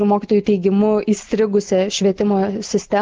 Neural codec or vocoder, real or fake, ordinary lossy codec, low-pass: none; real; Opus, 16 kbps; 7.2 kHz